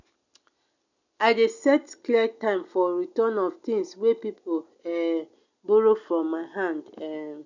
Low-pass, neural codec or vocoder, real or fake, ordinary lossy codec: 7.2 kHz; none; real; none